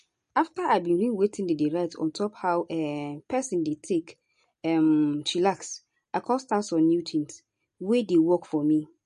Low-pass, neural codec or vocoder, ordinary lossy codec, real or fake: 14.4 kHz; none; MP3, 48 kbps; real